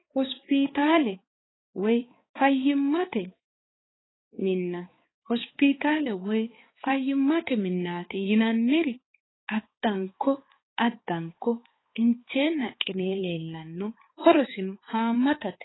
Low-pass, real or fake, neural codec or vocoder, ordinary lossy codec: 7.2 kHz; fake; codec, 16 kHz, 4 kbps, X-Codec, HuBERT features, trained on balanced general audio; AAC, 16 kbps